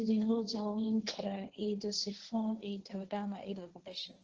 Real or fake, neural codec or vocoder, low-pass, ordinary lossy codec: fake; codec, 16 kHz, 1.1 kbps, Voila-Tokenizer; 7.2 kHz; Opus, 16 kbps